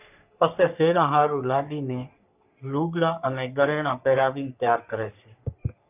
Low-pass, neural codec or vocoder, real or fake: 3.6 kHz; codec, 44.1 kHz, 3.4 kbps, Pupu-Codec; fake